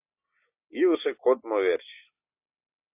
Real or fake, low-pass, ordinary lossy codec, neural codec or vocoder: real; 3.6 kHz; AAC, 32 kbps; none